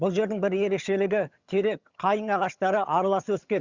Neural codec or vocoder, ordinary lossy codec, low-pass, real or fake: vocoder, 22.05 kHz, 80 mel bands, HiFi-GAN; Opus, 64 kbps; 7.2 kHz; fake